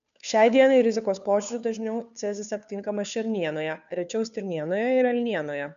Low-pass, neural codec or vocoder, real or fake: 7.2 kHz; codec, 16 kHz, 2 kbps, FunCodec, trained on Chinese and English, 25 frames a second; fake